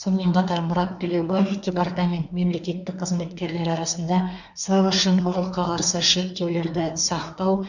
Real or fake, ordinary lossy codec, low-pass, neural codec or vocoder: fake; none; 7.2 kHz; codec, 24 kHz, 1 kbps, SNAC